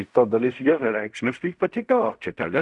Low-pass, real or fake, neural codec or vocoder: 10.8 kHz; fake; codec, 16 kHz in and 24 kHz out, 0.4 kbps, LongCat-Audio-Codec, fine tuned four codebook decoder